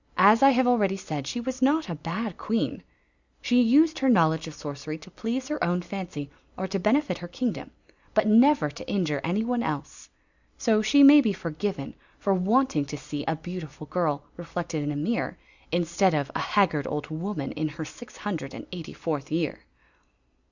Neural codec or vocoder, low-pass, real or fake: none; 7.2 kHz; real